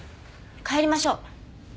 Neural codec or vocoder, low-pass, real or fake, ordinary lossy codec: none; none; real; none